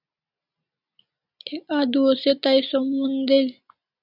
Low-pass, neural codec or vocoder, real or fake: 5.4 kHz; none; real